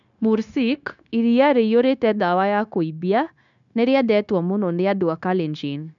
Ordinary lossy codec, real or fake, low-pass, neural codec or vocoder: none; fake; 7.2 kHz; codec, 16 kHz, 0.9 kbps, LongCat-Audio-Codec